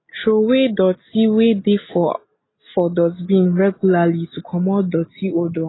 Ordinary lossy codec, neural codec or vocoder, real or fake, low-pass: AAC, 16 kbps; none; real; 7.2 kHz